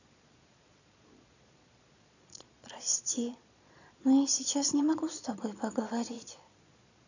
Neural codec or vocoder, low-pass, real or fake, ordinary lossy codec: vocoder, 22.05 kHz, 80 mel bands, Vocos; 7.2 kHz; fake; none